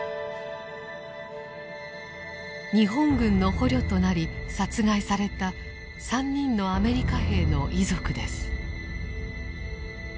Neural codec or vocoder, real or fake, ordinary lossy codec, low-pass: none; real; none; none